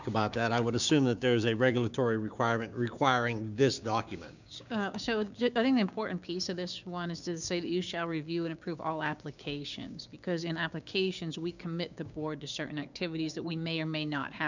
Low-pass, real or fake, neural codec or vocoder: 7.2 kHz; fake; codec, 16 kHz, 6 kbps, DAC